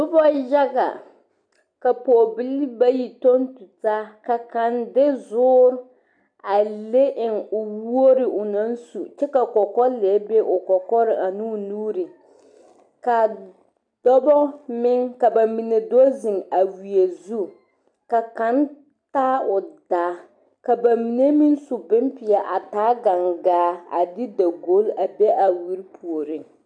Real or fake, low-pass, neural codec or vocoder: real; 9.9 kHz; none